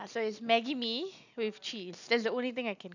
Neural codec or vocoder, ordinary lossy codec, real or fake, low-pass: none; none; real; 7.2 kHz